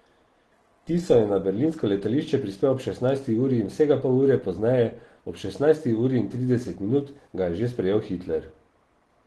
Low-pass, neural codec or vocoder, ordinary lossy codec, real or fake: 10.8 kHz; none; Opus, 16 kbps; real